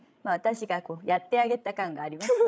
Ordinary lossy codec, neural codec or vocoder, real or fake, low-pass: none; codec, 16 kHz, 16 kbps, FreqCodec, larger model; fake; none